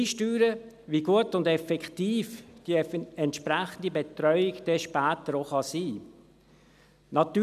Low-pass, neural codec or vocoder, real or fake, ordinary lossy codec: 14.4 kHz; none; real; none